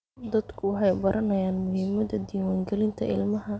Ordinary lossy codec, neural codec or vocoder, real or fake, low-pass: none; none; real; none